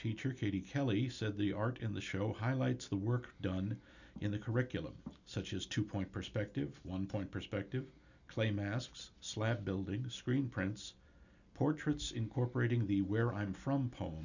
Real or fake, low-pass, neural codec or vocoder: real; 7.2 kHz; none